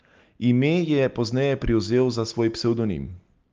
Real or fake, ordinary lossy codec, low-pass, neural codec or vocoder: real; Opus, 24 kbps; 7.2 kHz; none